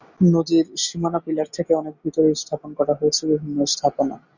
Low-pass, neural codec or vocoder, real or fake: 7.2 kHz; none; real